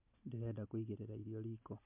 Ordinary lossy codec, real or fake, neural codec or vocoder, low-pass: MP3, 32 kbps; real; none; 3.6 kHz